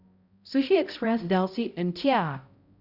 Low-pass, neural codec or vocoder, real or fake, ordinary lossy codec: 5.4 kHz; codec, 16 kHz, 0.5 kbps, X-Codec, HuBERT features, trained on balanced general audio; fake; Opus, 64 kbps